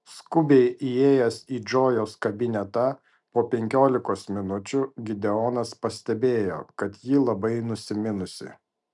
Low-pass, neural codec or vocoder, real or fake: 10.8 kHz; none; real